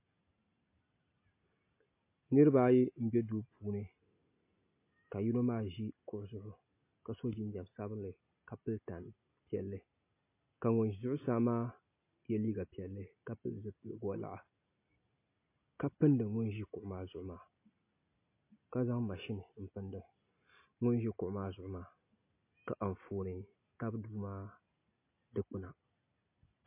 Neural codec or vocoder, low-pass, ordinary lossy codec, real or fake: none; 3.6 kHz; MP3, 24 kbps; real